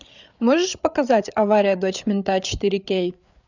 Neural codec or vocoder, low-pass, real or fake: codec, 16 kHz, 8 kbps, FreqCodec, larger model; 7.2 kHz; fake